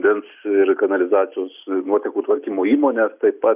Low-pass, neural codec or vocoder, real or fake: 3.6 kHz; none; real